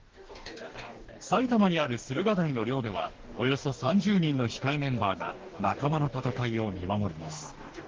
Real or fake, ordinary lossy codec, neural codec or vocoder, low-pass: fake; Opus, 16 kbps; codec, 44.1 kHz, 2.6 kbps, DAC; 7.2 kHz